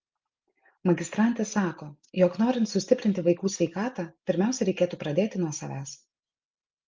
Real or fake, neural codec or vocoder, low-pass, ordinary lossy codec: real; none; 7.2 kHz; Opus, 24 kbps